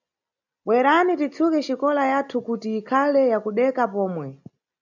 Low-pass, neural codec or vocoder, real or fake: 7.2 kHz; none; real